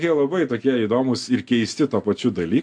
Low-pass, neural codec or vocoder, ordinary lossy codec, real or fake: 9.9 kHz; none; MP3, 64 kbps; real